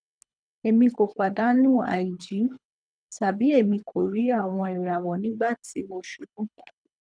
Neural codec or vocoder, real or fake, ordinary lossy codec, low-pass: codec, 24 kHz, 3 kbps, HILCodec; fake; none; 9.9 kHz